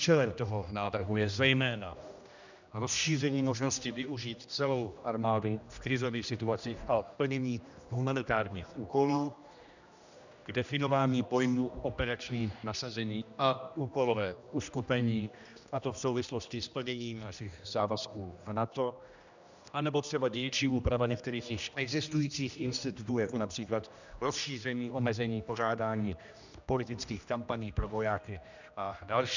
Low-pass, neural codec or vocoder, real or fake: 7.2 kHz; codec, 16 kHz, 1 kbps, X-Codec, HuBERT features, trained on general audio; fake